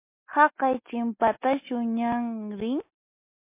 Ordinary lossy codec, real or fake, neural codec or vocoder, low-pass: MP3, 24 kbps; real; none; 3.6 kHz